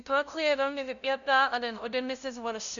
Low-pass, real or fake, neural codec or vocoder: 7.2 kHz; fake; codec, 16 kHz, 0.5 kbps, FunCodec, trained on LibriTTS, 25 frames a second